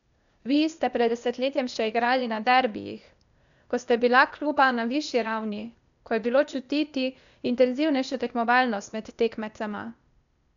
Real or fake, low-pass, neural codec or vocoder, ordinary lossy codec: fake; 7.2 kHz; codec, 16 kHz, 0.8 kbps, ZipCodec; none